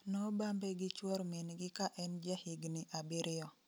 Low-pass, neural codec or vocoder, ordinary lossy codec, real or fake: none; none; none; real